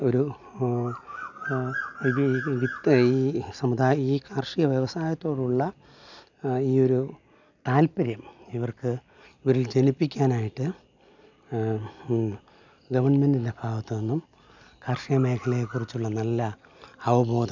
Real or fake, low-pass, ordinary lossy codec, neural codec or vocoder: real; 7.2 kHz; none; none